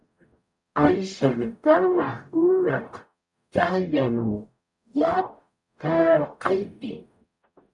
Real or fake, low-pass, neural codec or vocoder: fake; 10.8 kHz; codec, 44.1 kHz, 0.9 kbps, DAC